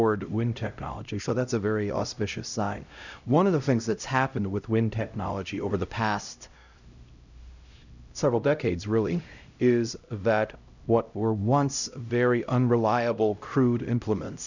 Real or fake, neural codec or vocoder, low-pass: fake; codec, 16 kHz, 0.5 kbps, X-Codec, HuBERT features, trained on LibriSpeech; 7.2 kHz